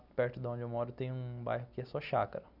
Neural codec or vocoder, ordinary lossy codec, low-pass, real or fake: none; none; 5.4 kHz; real